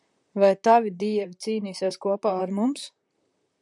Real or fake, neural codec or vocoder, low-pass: fake; vocoder, 44.1 kHz, 128 mel bands, Pupu-Vocoder; 10.8 kHz